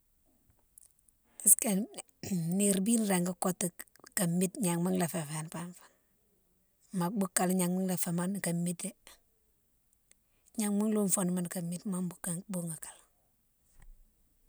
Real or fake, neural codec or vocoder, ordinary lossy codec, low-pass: real; none; none; none